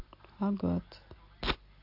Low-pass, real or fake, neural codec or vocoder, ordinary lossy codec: 5.4 kHz; real; none; AAC, 32 kbps